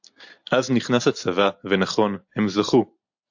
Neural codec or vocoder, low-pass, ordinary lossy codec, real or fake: none; 7.2 kHz; AAC, 48 kbps; real